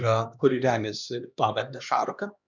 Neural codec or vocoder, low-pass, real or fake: codec, 16 kHz, 2 kbps, X-Codec, HuBERT features, trained on LibriSpeech; 7.2 kHz; fake